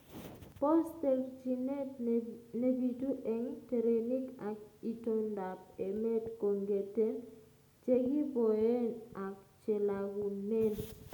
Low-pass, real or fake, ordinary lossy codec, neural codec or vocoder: none; real; none; none